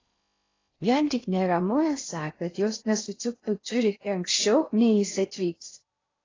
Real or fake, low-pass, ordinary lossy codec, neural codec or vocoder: fake; 7.2 kHz; AAC, 32 kbps; codec, 16 kHz in and 24 kHz out, 0.6 kbps, FocalCodec, streaming, 4096 codes